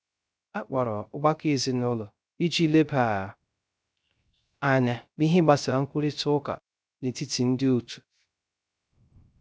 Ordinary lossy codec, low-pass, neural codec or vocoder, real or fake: none; none; codec, 16 kHz, 0.3 kbps, FocalCodec; fake